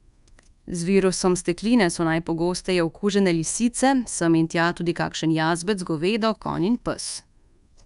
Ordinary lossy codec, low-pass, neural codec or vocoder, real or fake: none; 10.8 kHz; codec, 24 kHz, 1.2 kbps, DualCodec; fake